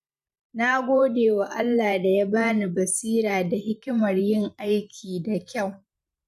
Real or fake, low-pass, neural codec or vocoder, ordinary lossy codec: fake; 14.4 kHz; vocoder, 44.1 kHz, 128 mel bands every 512 samples, BigVGAN v2; none